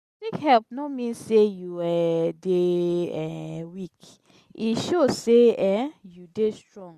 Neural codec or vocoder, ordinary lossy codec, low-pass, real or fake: none; none; 14.4 kHz; real